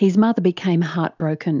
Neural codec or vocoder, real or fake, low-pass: none; real; 7.2 kHz